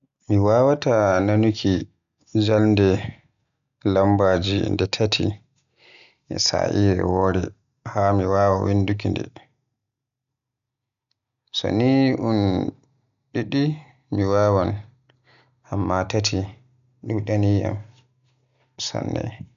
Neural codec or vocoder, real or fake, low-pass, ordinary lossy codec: none; real; 7.2 kHz; none